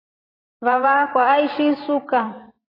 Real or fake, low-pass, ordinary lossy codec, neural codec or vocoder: fake; 5.4 kHz; AAC, 24 kbps; vocoder, 44.1 kHz, 128 mel bands every 512 samples, BigVGAN v2